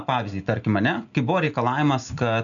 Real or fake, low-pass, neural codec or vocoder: real; 7.2 kHz; none